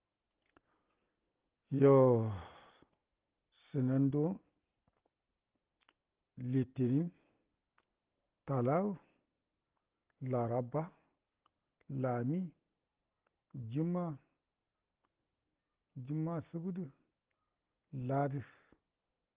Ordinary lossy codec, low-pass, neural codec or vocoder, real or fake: Opus, 16 kbps; 3.6 kHz; none; real